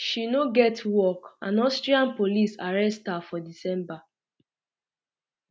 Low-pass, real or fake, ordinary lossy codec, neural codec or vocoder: none; real; none; none